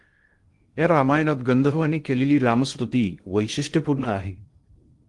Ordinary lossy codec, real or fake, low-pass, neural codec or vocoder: Opus, 24 kbps; fake; 10.8 kHz; codec, 16 kHz in and 24 kHz out, 0.6 kbps, FocalCodec, streaming, 2048 codes